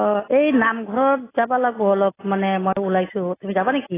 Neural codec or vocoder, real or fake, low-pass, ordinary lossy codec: none; real; 3.6 kHz; AAC, 16 kbps